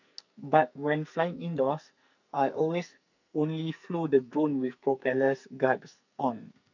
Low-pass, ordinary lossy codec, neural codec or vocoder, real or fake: 7.2 kHz; none; codec, 44.1 kHz, 2.6 kbps, SNAC; fake